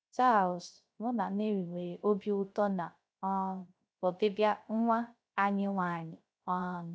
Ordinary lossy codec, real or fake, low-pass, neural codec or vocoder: none; fake; none; codec, 16 kHz, 0.3 kbps, FocalCodec